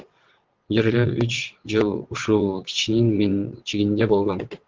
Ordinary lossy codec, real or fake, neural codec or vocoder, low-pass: Opus, 16 kbps; fake; vocoder, 22.05 kHz, 80 mel bands, WaveNeXt; 7.2 kHz